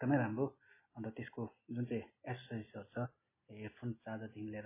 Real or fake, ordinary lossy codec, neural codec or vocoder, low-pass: real; MP3, 16 kbps; none; 3.6 kHz